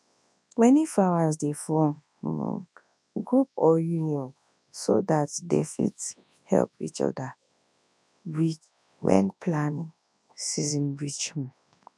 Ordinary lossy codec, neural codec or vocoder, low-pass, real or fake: none; codec, 24 kHz, 0.9 kbps, WavTokenizer, large speech release; none; fake